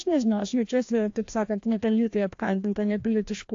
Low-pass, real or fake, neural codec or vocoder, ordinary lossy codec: 7.2 kHz; fake; codec, 16 kHz, 1 kbps, FreqCodec, larger model; AAC, 48 kbps